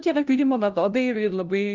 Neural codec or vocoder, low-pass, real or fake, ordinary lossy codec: codec, 16 kHz, 0.5 kbps, FunCodec, trained on LibriTTS, 25 frames a second; 7.2 kHz; fake; Opus, 24 kbps